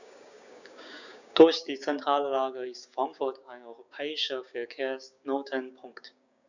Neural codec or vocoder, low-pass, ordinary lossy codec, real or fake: codec, 16 kHz, 6 kbps, DAC; 7.2 kHz; none; fake